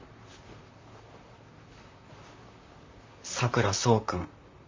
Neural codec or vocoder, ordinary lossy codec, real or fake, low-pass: vocoder, 44.1 kHz, 128 mel bands, Pupu-Vocoder; MP3, 64 kbps; fake; 7.2 kHz